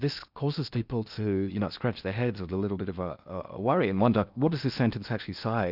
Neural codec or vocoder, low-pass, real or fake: codec, 16 kHz in and 24 kHz out, 0.8 kbps, FocalCodec, streaming, 65536 codes; 5.4 kHz; fake